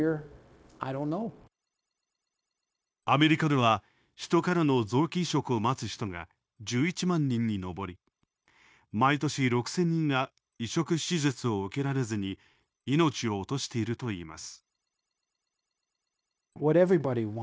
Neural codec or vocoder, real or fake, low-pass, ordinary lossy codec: codec, 16 kHz, 0.9 kbps, LongCat-Audio-Codec; fake; none; none